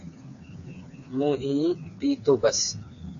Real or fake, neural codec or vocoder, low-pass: fake; codec, 16 kHz, 4 kbps, FreqCodec, smaller model; 7.2 kHz